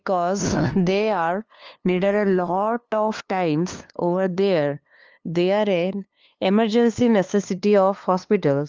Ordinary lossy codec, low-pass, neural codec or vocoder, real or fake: Opus, 24 kbps; 7.2 kHz; codec, 16 kHz, 2 kbps, FunCodec, trained on LibriTTS, 25 frames a second; fake